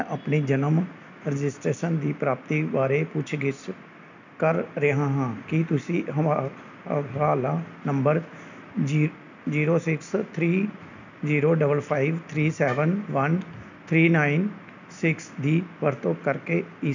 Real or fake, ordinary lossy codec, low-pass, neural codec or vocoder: real; none; 7.2 kHz; none